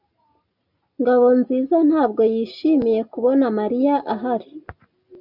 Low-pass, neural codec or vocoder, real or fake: 5.4 kHz; none; real